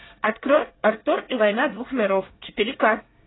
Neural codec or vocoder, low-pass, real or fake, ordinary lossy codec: codec, 24 kHz, 1 kbps, SNAC; 7.2 kHz; fake; AAC, 16 kbps